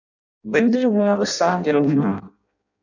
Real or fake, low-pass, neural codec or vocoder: fake; 7.2 kHz; codec, 16 kHz in and 24 kHz out, 0.6 kbps, FireRedTTS-2 codec